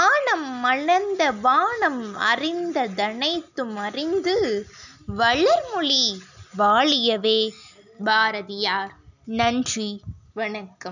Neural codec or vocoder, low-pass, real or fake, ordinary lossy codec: none; 7.2 kHz; real; none